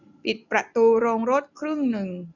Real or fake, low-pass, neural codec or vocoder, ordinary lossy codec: real; 7.2 kHz; none; none